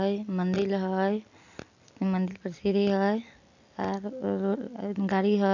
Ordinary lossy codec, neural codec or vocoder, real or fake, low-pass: none; none; real; 7.2 kHz